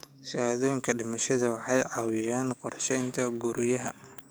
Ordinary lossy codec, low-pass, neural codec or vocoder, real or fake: none; none; codec, 44.1 kHz, 7.8 kbps, DAC; fake